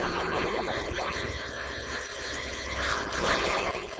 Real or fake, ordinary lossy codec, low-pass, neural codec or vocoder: fake; none; none; codec, 16 kHz, 4.8 kbps, FACodec